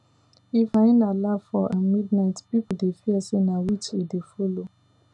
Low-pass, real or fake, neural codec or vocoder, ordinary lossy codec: 10.8 kHz; real; none; none